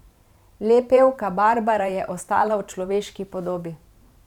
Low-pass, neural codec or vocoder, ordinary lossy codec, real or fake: 19.8 kHz; vocoder, 44.1 kHz, 128 mel bands every 512 samples, BigVGAN v2; none; fake